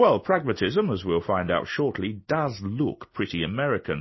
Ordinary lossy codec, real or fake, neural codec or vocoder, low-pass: MP3, 24 kbps; real; none; 7.2 kHz